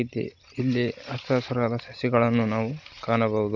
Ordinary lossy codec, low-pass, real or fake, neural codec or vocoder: none; 7.2 kHz; real; none